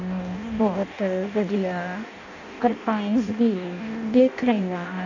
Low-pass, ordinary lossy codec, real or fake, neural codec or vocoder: 7.2 kHz; none; fake; codec, 16 kHz in and 24 kHz out, 0.6 kbps, FireRedTTS-2 codec